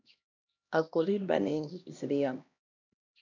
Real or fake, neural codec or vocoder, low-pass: fake; codec, 16 kHz, 1 kbps, X-Codec, HuBERT features, trained on LibriSpeech; 7.2 kHz